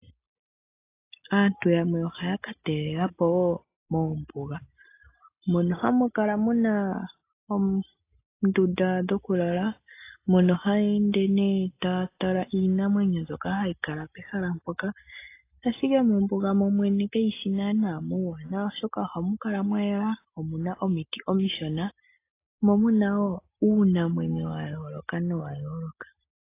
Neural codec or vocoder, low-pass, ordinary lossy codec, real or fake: none; 3.6 kHz; AAC, 24 kbps; real